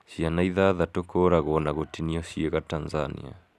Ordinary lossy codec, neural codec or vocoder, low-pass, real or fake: none; none; 14.4 kHz; real